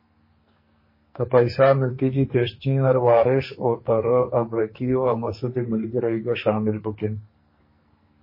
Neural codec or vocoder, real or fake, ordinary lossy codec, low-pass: codec, 44.1 kHz, 2.6 kbps, SNAC; fake; MP3, 24 kbps; 5.4 kHz